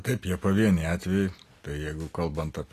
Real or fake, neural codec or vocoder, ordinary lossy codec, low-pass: real; none; AAC, 48 kbps; 14.4 kHz